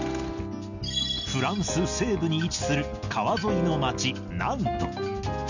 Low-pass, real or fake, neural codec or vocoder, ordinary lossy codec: 7.2 kHz; real; none; none